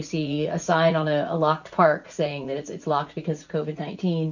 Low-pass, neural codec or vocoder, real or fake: 7.2 kHz; vocoder, 44.1 kHz, 80 mel bands, Vocos; fake